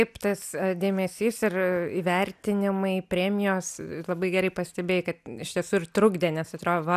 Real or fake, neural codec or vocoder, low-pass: real; none; 14.4 kHz